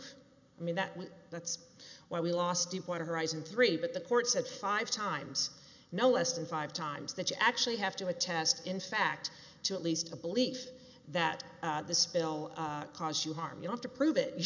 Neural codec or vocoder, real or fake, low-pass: none; real; 7.2 kHz